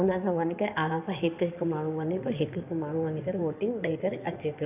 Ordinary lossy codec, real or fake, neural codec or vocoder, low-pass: none; fake; codec, 16 kHz in and 24 kHz out, 2.2 kbps, FireRedTTS-2 codec; 3.6 kHz